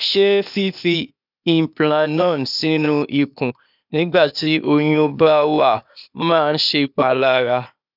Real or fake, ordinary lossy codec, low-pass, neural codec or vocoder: fake; none; 5.4 kHz; codec, 16 kHz, 0.8 kbps, ZipCodec